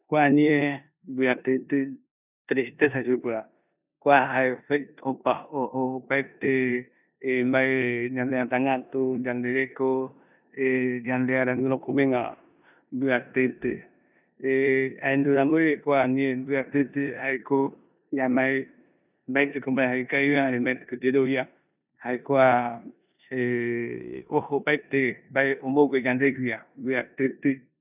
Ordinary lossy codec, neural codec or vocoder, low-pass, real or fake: none; codec, 16 kHz in and 24 kHz out, 0.9 kbps, LongCat-Audio-Codec, four codebook decoder; 3.6 kHz; fake